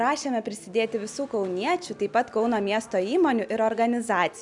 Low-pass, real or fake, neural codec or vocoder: 10.8 kHz; real; none